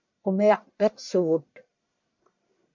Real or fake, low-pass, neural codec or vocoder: fake; 7.2 kHz; codec, 44.1 kHz, 1.7 kbps, Pupu-Codec